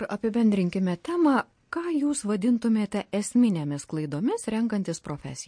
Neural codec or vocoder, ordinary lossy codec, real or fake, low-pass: none; MP3, 48 kbps; real; 9.9 kHz